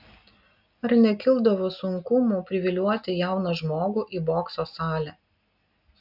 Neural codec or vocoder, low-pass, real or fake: none; 5.4 kHz; real